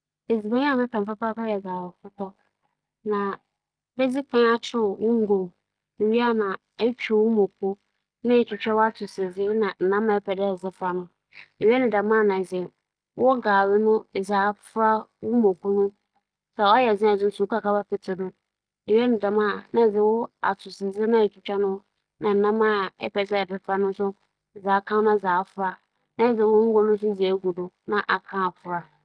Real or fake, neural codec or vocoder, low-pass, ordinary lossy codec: real; none; 9.9 kHz; Opus, 32 kbps